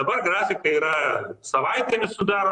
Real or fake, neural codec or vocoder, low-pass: fake; vocoder, 44.1 kHz, 128 mel bands, Pupu-Vocoder; 10.8 kHz